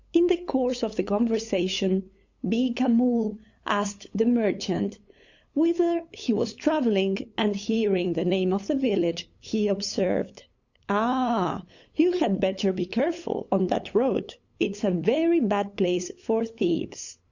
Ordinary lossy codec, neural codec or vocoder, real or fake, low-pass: Opus, 64 kbps; codec, 16 kHz, 8 kbps, FunCodec, trained on LibriTTS, 25 frames a second; fake; 7.2 kHz